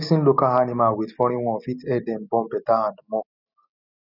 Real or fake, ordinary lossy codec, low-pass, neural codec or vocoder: real; none; 5.4 kHz; none